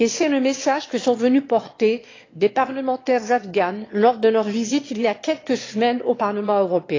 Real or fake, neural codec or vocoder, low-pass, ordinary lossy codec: fake; autoencoder, 22.05 kHz, a latent of 192 numbers a frame, VITS, trained on one speaker; 7.2 kHz; AAC, 32 kbps